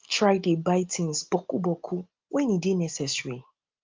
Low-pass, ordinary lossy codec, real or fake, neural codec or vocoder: 7.2 kHz; Opus, 32 kbps; real; none